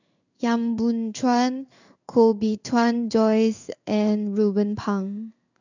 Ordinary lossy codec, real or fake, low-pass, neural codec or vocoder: none; fake; 7.2 kHz; codec, 16 kHz in and 24 kHz out, 1 kbps, XY-Tokenizer